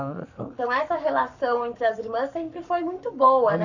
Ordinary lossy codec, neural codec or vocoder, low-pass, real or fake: none; codec, 44.1 kHz, 7.8 kbps, Pupu-Codec; 7.2 kHz; fake